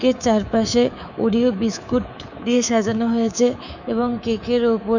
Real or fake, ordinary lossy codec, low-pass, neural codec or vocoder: fake; none; 7.2 kHz; codec, 24 kHz, 3.1 kbps, DualCodec